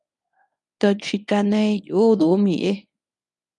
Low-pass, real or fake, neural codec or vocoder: 10.8 kHz; fake; codec, 24 kHz, 0.9 kbps, WavTokenizer, medium speech release version 1